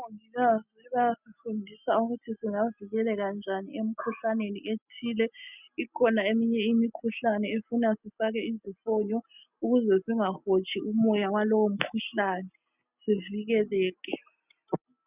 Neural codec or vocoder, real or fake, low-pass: none; real; 3.6 kHz